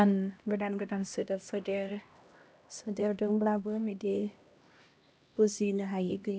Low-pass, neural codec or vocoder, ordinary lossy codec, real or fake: none; codec, 16 kHz, 1 kbps, X-Codec, HuBERT features, trained on LibriSpeech; none; fake